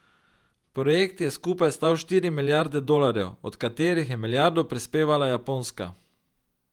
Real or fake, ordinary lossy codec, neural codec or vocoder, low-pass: fake; Opus, 32 kbps; vocoder, 48 kHz, 128 mel bands, Vocos; 19.8 kHz